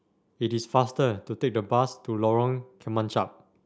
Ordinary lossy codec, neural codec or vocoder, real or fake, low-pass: none; none; real; none